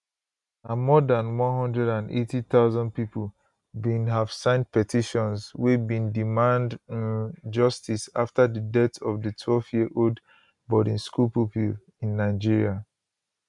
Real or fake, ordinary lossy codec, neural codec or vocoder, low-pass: real; none; none; 10.8 kHz